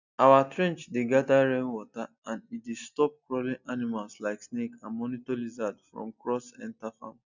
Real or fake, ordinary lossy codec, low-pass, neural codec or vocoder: real; none; 7.2 kHz; none